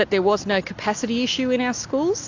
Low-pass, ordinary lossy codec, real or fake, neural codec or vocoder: 7.2 kHz; AAC, 48 kbps; real; none